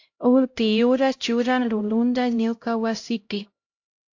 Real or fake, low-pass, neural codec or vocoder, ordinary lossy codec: fake; 7.2 kHz; codec, 16 kHz, 0.5 kbps, X-Codec, HuBERT features, trained on LibriSpeech; AAC, 48 kbps